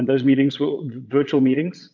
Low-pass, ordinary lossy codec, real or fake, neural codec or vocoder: 7.2 kHz; MP3, 64 kbps; fake; vocoder, 22.05 kHz, 80 mel bands, Vocos